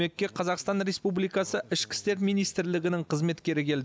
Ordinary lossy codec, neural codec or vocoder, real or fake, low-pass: none; none; real; none